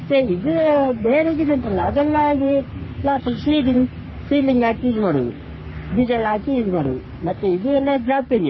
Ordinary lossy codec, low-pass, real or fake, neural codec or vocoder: MP3, 24 kbps; 7.2 kHz; fake; codec, 44.1 kHz, 3.4 kbps, Pupu-Codec